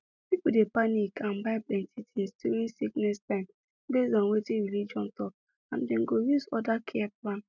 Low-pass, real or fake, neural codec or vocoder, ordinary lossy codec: 7.2 kHz; real; none; none